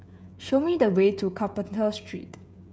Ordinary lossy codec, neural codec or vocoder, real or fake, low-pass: none; codec, 16 kHz, 16 kbps, FreqCodec, smaller model; fake; none